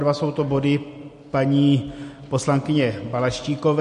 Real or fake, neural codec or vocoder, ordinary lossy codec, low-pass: real; none; MP3, 48 kbps; 14.4 kHz